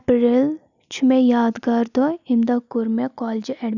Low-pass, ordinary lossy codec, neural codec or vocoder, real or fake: 7.2 kHz; none; none; real